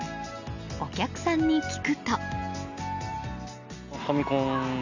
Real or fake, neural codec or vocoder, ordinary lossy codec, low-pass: real; none; none; 7.2 kHz